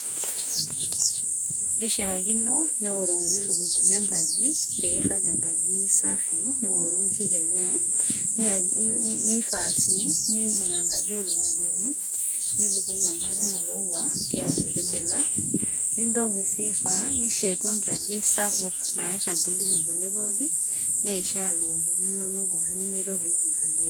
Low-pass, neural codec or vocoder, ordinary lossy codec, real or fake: none; codec, 44.1 kHz, 2.6 kbps, DAC; none; fake